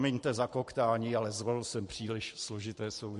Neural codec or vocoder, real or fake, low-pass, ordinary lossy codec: vocoder, 44.1 kHz, 128 mel bands every 512 samples, BigVGAN v2; fake; 14.4 kHz; MP3, 48 kbps